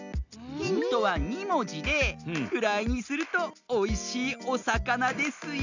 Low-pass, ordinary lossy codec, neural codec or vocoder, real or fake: 7.2 kHz; none; none; real